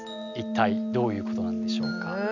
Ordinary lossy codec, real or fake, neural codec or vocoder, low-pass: none; real; none; 7.2 kHz